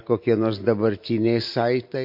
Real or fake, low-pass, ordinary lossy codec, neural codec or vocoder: real; 5.4 kHz; MP3, 32 kbps; none